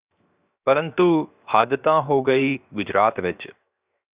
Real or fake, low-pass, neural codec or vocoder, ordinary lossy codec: fake; 3.6 kHz; codec, 16 kHz, 0.7 kbps, FocalCodec; Opus, 64 kbps